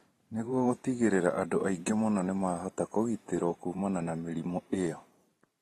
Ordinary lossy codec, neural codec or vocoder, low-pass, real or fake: AAC, 32 kbps; vocoder, 44.1 kHz, 128 mel bands every 512 samples, BigVGAN v2; 19.8 kHz; fake